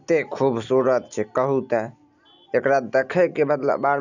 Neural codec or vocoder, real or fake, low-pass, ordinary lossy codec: none; real; 7.2 kHz; none